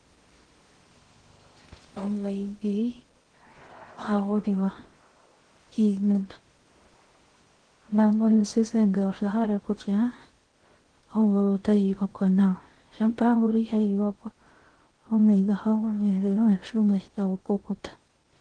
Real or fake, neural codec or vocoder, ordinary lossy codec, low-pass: fake; codec, 16 kHz in and 24 kHz out, 0.6 kbps, FocalCodec, streaming, 2048 codes; Opus, 16 kbps; 9.9 kHz